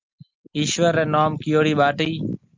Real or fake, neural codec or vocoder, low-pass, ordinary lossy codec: real; none; 7.2 kHz; Opus, 24 kbps